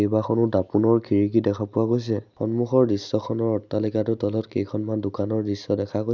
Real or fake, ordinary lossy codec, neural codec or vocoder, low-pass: real; none; none; 7.2 kHz